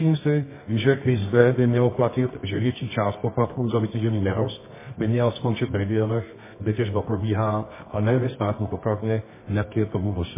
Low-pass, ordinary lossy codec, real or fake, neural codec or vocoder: 3.6 kHz; MP3, 16 kbps; fake; codec, 24 kHz, 0.9 kbps, WavTokenizer, medium music audio release